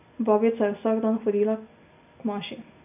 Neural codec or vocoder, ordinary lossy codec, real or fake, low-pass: none; none; real; 3.6 kHz